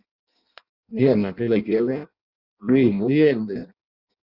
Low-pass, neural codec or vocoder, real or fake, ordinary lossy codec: 5.4 kHz; codec, 16 kHz in and 24 kHz out, 0.6 kbps, FireRedTTS-2 codec; fake; Opus, 64 kbps